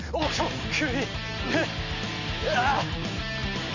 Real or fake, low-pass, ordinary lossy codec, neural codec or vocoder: real; 7.2 kHz; none; none